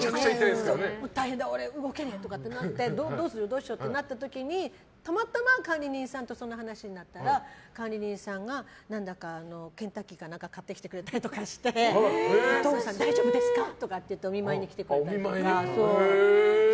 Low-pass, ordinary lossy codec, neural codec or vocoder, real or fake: none; none; none; real